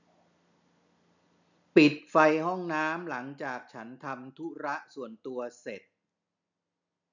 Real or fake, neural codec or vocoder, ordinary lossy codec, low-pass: real; none; none; 7.2 kHz